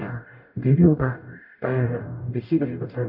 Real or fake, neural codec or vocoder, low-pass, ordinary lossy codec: fake; codec, 44.1 kHz, 0.9 kbps, DAC; 5.4 kHz; none